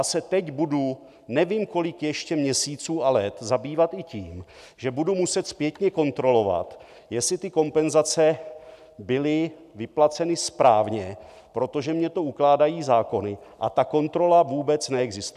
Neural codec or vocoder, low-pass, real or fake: none; 14.4 kHz; real